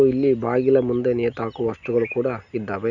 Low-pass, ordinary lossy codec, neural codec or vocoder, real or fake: 7.2 kHz; none; none; real